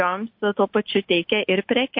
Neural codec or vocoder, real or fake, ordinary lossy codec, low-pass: none; real; MP3, 32 kbps; 5.4 kHz